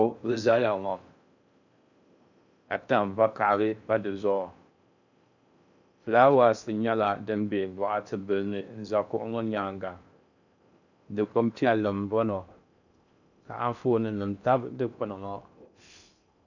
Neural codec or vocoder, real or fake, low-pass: codec, 16 kHz in and 24 kHz out, 0.6 kbps, FocalCodec, streaming, 4096 codes; fake; 7.2 kHz